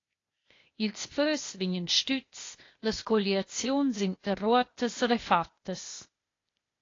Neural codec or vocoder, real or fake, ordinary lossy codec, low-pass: codec, 16 kHz, 0.8 kbps, ZipCodec; fake; AAC, 32 kbps; 7.2 kHz